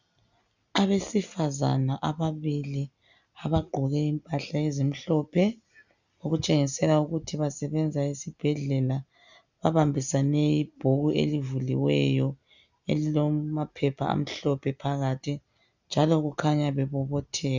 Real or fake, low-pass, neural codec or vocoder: real; 7.2 kHz; none